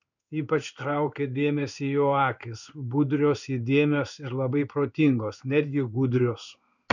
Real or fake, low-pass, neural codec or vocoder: fake; 7.2 kHz; codec, 16 kHz in and 24 kHz out, 1 kbps, XY-Tokenizer